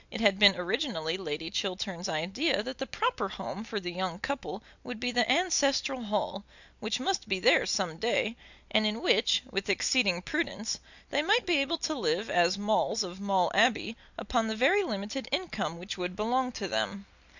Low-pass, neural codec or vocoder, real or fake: 7.2 kHz; none; real